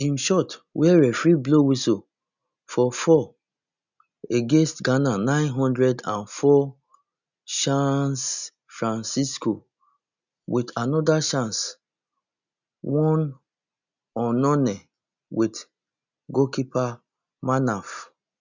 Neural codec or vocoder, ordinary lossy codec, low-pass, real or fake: none; none; 7.2 kHz; real